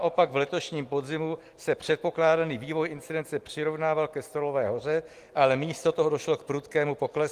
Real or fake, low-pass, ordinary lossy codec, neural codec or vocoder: real; 14.4 kHz; Opus, 24 kbps; none